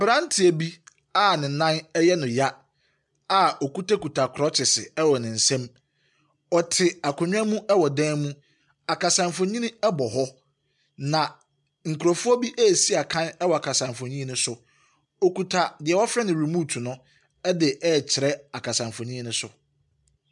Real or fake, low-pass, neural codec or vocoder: real; 10.8 kHz; none